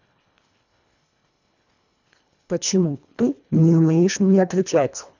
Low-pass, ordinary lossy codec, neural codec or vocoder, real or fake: 7.2 kHz; none; codec, 24 kHz, 1.5 kbps, HILCodec; fake